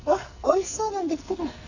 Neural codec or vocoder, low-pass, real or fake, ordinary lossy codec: codec, 44.1 kHz, 2.6 kbps, SNAC; 7.2 kHz; fake; none